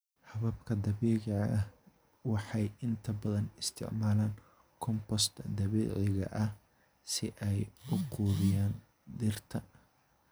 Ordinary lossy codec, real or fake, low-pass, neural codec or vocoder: none; real; none; none